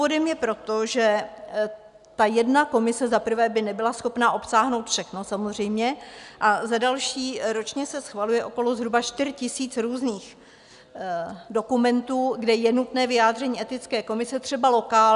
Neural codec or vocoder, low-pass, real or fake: none; 10.8 kHz; real